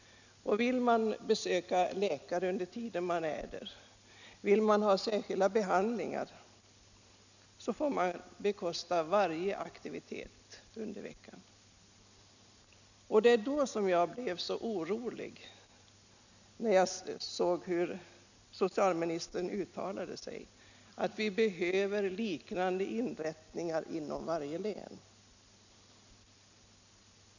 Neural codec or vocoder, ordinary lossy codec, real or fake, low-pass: none; none; real; 7.2 kHz